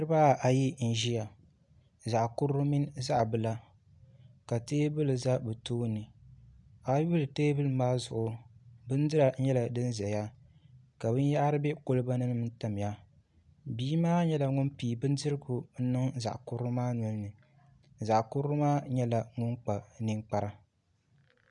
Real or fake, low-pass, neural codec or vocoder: real; 10.8 kHz; none